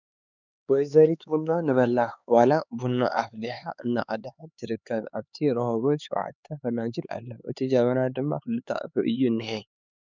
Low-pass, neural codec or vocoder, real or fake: 7.2 kHz; codec, 16 kHz, 4 kbps, X-Codec, HuBERT features, trained on LibriSpeech; fake